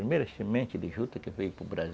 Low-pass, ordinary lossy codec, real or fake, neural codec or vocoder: none; none; real; none